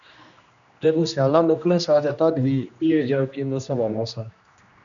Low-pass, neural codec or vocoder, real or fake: 7.2 kHz; codec, 16 kHz, 1 kbps, X-Codec, HuBERT features, trained on general audio; fake